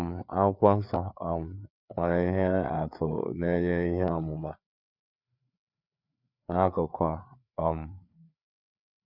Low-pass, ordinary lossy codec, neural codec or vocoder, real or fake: 5.4 kHz; none; codec, 16 kHz, 4 kbps, FreqCodec, larger model; fake